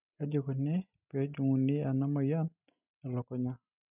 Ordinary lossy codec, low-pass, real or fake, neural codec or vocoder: none; 3.6 kHz; real; none